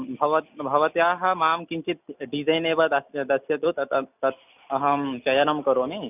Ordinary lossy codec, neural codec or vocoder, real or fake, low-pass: none; none; real; 3.6 kHz